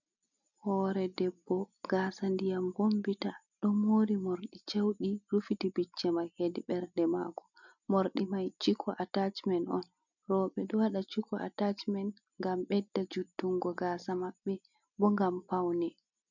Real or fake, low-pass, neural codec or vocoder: real; 7.2 kHz; none